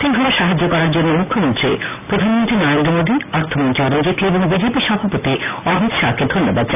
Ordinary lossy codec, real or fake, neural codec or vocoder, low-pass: none; real; none; 3.6 kHz